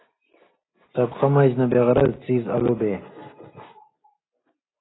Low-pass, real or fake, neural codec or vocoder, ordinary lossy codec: 7.2 kHz; real; none; AAC, 16 kbps